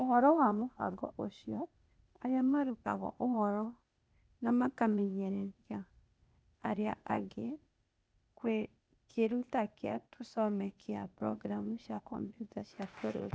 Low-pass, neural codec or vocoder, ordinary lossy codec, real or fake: none; codec, 16 kHz, 0.8 kbps, ZipCodec; none; fake